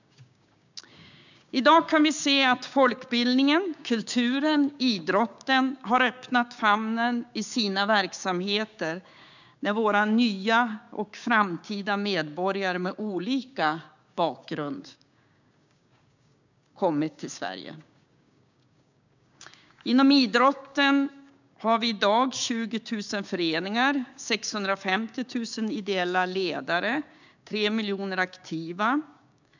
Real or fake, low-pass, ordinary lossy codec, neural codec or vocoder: fake; 7.2 kHz; none; codec, 16 kHz, 6 kbps, DAC